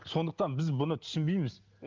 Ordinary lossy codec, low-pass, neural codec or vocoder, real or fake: Opus, 32 kbps; 7.2 kHz; none; real